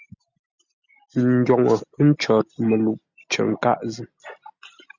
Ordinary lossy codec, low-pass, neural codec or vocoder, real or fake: Opus, 64 kbps; 7.2 kHz; none; real